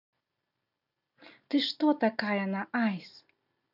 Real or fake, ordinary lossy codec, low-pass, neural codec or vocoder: real; none; 5.4 kHz; none